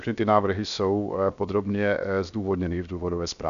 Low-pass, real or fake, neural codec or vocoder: 7.2 kHz; fake; codec, 16 kHz, 0.7 kbps, FocalCodec